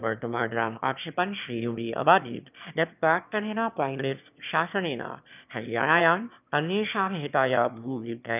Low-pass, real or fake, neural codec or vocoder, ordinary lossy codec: 3.6 kHz; fake; autoencoder, 22.05 kHz, a latent of 192 numbers a frame, VITS, trained on one speaker; none